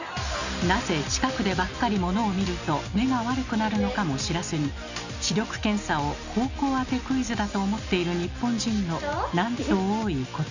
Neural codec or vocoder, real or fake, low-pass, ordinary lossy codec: none; real; 7.2 kHz; none